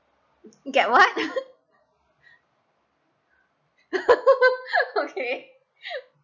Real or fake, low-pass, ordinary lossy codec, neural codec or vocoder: real; 7.2 kHz; none; none